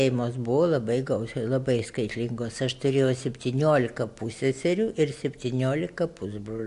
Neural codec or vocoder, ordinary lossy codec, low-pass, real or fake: none; AAC, 64 kbps; 10.8 kHz; real